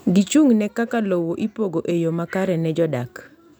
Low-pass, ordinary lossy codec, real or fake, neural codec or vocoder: none; none; real; none